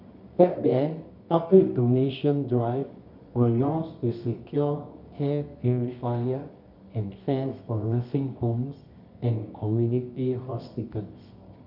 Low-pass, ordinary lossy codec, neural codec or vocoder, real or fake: 5.4 kHz; none; codec, 24 kHz, 0.9 kbps, WavTokenizer, medium music audio release; fake